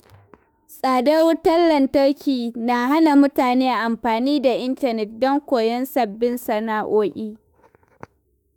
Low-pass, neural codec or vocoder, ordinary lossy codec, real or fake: none; autoencoder, 48 kHz, 32 numbers a frame, DAC-VAE, trained on Japanese speech; none; fake